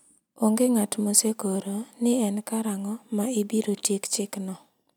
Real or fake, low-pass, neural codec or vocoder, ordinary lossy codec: real; none; none; none